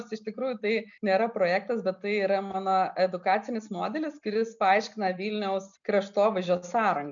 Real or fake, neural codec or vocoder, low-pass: real; none; 7.2 kHz